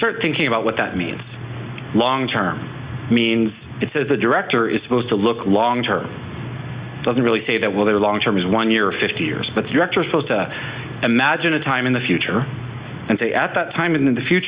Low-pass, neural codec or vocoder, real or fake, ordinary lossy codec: 3.6 kHz; none; real; Opus, 64 kbps